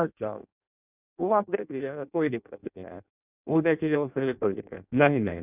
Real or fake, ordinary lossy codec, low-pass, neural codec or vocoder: fake; none; 3.6 kHz; codec, 16 kHz in and 24 kHz out, 0.6 kbps, FireRedTTS-2 codec